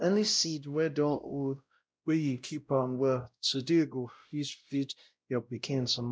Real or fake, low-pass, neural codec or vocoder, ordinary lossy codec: fake; none; codec, 16 kHz, 0.5 kbps, X-Codec, WavLM features, trained on Multilingual LibriSpeech; none